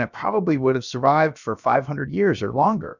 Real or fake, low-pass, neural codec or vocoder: fake; 7.2 kHz; codec, 16 kHz, about 1 kbps, DyCAST, with the encoder's durations